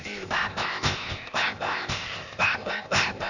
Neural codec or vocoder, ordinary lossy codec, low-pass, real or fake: codec, 16 kHz, 0.8 kbps, ZipCodec; none; 7.2 kHz; fake